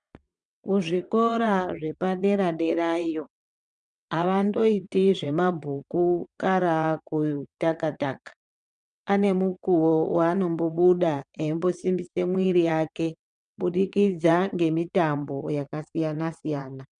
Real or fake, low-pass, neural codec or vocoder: fake; 9.9 kHz; vocoder, 22.05 kHz, 80 mel bands, WaveNeXt